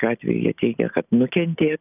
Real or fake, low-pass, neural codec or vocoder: real; 3.6 kHz; none